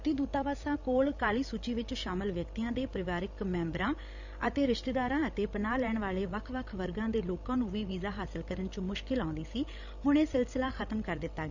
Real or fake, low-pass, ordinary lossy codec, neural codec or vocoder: fake; 7.2 kHz; none; codec, 16 kHz, 16 kbps, FreqCodec, larger model